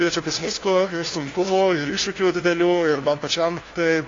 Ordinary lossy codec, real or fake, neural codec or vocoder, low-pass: AAC, 32 kbps; fake; codec, 16 kHz, 1 kbps, FunCodec, trained on LibriTTS, 50 frames a second; 7.2 kHz